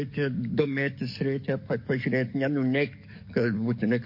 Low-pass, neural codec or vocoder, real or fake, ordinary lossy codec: 5.4 kHz; codec, 44.1 kHz, 7.8 kbps, DAC; fake; MP3, 32 kbps